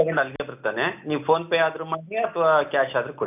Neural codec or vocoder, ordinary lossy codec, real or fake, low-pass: none; none; real; 3.6 kHz